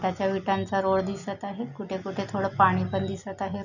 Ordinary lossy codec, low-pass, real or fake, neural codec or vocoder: none; 7.2 kHz; real; none